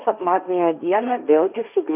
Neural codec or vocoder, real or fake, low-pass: codec, 16 kHz, 1.1 kbps, Voila-Tokenizer; fake; 3.6 kHz